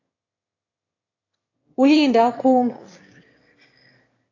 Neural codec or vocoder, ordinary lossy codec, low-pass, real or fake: autoencoder, 22.05 kHz, a latent of 192 numbers a frame, VITS, trained on one speaker; AAC, 32 kbps; 7.2 kHz; fake